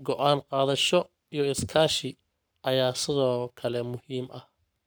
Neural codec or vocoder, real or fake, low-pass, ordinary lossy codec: codec, 44.1 kHz, 7.8 kbps, Pupu-Codec; fake; none; none